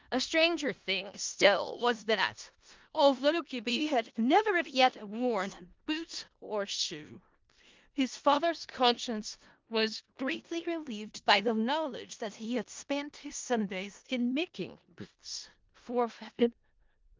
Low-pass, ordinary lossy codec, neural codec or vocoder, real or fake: 7.2 kHz; Opus, 24 kbps; codec, 16 kHz in and 24 kHz out, 0.4 kbps, LongCat-Audio-Codec, four codebook decoder; fake